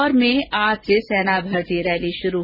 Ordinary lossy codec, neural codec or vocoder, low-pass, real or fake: none; none; 5.4 kHz; real